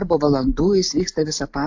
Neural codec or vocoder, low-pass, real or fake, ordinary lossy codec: none; 7.2 kHz; real; AAC, 48 kbps